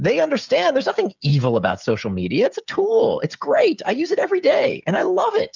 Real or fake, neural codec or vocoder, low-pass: fake; codec, 16 kHz, 8 kbps, FreqCodec, smaller model; 7.2 kHz